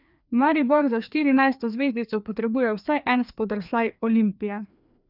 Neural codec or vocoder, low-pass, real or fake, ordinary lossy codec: codec, 16 kHz, 2 kbps, FreqCodec, larger model; 5.4 kHz; fake; none